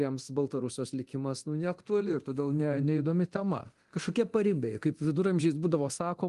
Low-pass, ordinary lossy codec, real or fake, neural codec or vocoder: 10.8 kHz; Opus, 24 kbps; fake; codec, 24 kHz, 0.9 kbps, DualCodec